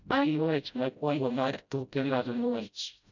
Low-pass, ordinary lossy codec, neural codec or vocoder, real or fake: 7.2 kHz; none; codec, 16 kHz, 0.5 kbps, FreqCodec, smaller model; fake